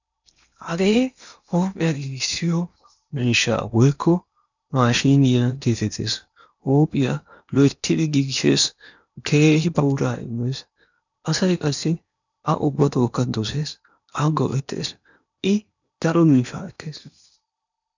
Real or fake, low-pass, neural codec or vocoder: fake; 7.2 kHz; codec, 16 kHz in and 24 kHz out, 0.8 kbps, FocalCodec, streaming, 65536 codes